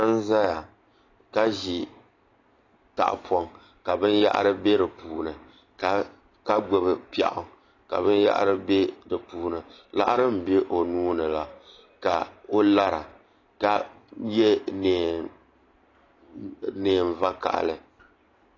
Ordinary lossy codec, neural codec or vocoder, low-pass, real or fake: AAC, 32 kbps; none; 7.2 kHz; real